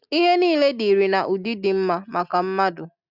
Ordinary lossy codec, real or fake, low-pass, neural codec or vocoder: none; real; 5.4 kHz; none